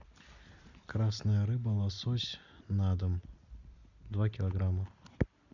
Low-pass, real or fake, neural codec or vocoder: 7.2 kHz; real; none